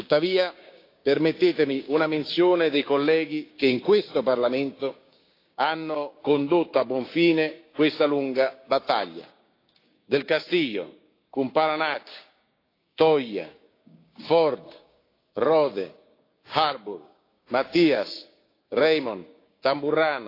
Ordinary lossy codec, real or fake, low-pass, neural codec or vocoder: AAC, 32 kbps; fake; 5.4 kHz; codec, 16 kHz, 6 kbps, DAC